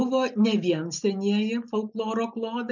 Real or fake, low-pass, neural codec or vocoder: real; 7.2 kHz; none